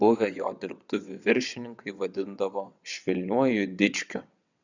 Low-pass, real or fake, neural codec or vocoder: 7.2 kHz; fake; vocoder, 22.05 kHz, 80 mel bands, WaveNeXt